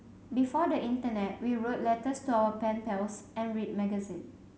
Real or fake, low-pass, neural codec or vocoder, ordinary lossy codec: real; none; none; none